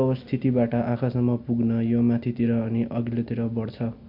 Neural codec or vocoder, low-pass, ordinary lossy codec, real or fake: none; 5.4 kHz; MP3, 48 kbps; real